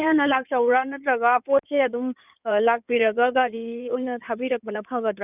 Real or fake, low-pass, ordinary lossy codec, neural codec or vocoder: fake; 3.6 kHz; none; codec, 16 kHz in and 24 kHz out, 2.2 kbps, FireRedTTS-2 codec